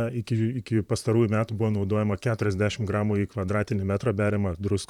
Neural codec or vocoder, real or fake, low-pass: vocoder, 44.1 kHz, 128 mel bands, Pupu-Vocoder; fake; 19.8 kHz